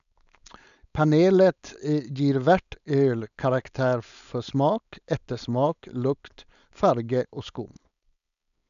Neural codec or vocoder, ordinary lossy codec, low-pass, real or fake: codec, 16 kHz, 4.8 kbps, FACodec; none; 7.2 kHz; fake